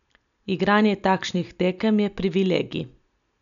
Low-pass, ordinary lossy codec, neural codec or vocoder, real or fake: 7.2 kHz; none; none; real